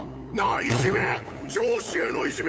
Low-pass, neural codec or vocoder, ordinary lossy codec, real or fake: none; codec, 16 kHz, 8 kbps, FunCodec, trained on LibriTTS, 25 frames a second; none; fake